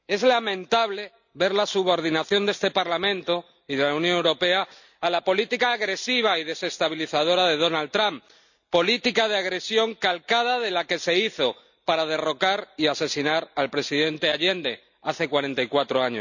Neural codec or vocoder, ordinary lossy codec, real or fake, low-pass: none; none; real; 7.2 kHz